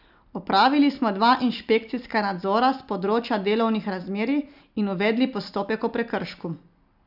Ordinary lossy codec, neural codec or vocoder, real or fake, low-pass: none; none; real; 5.4 kHz